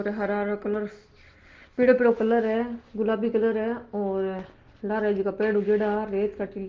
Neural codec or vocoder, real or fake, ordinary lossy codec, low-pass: none; real; Opus, 16 kbps; 7.2 kHz